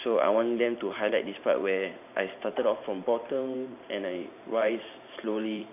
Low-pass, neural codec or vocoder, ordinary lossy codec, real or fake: 3.6 kHz; vocoder, 44.1 kHz, 128 mel bands every 512 samples, BigVGAN v2; AAC, 32 kbps; fake